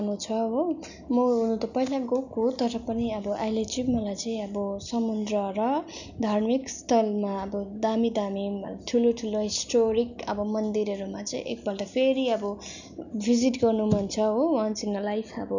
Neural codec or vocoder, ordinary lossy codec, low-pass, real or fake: none; none; 7.2 kHz; real